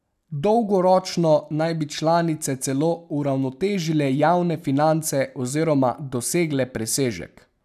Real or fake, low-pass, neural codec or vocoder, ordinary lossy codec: real; 14.4 kHz; none; none